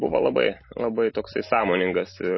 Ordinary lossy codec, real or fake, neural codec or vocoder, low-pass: MP3, 24 kbps; real; none; 7.2 kHz